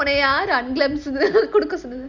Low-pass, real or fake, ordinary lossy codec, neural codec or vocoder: 7.2 kHz; real; Opus, 64 kbps; none